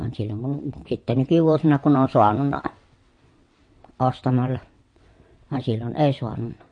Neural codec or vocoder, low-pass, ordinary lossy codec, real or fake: vocoder, 22.05 kHz, 80 mel bands, WaveNeXt; 9.9 kHz; MP3, 48 kbps; fake